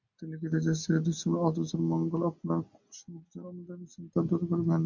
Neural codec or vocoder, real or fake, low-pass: none; real; 7.2 kHz